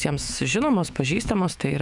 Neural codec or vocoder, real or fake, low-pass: autoencoder, 48 kHz, 128 numbers a frame, DAC-VAE, trained on Japanese speech; fake; 10.8 kHz